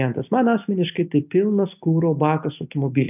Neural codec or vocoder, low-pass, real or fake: none; 3.6 kHz; real